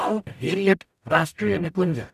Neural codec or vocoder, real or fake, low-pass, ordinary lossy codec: codec, 44.1 kHz, 0.9 kbps, DAC; fake; 14.4 kHz; none